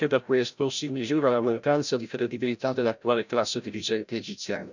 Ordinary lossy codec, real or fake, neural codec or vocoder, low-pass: none; fake; codec, 16 kHz, 0.5 kbps, FreqCodec, larger model; 7.2 kHz